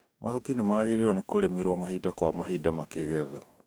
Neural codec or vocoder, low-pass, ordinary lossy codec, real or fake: codec, 44.1 kHz, 2.6 kbps, DAC; none; none; fake